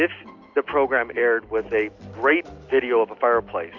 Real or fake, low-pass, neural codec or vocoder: real; 7.2 kHz; none